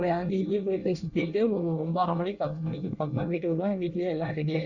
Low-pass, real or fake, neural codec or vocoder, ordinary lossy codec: 7.2 kHz; fake; codec, 24 kHz, 1 kbps, SNAC; Opus, 64 kbps